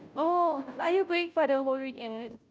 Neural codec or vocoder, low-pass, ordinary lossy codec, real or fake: codec, 16 kHz, 0.5 kbps, FunCodec, trained on Chinese and English, 25 frames a second; none; none; fake